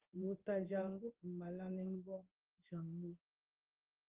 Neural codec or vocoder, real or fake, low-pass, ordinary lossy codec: codec, 16 kHz in and 24 kHz out, 1 kbps, XY-Tokenizer; fake; 3.6 kHz; Opus, 16 kbps